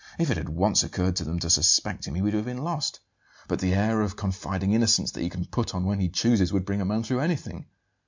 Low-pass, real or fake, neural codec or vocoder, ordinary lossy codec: 7.2 kHz; real; none; MP3, 64 kbps